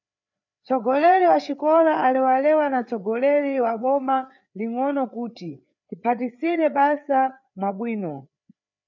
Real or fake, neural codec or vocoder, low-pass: fake; codec, 16 kHz, 4 kbps, FreqCodec, larger model; 7.2 kHz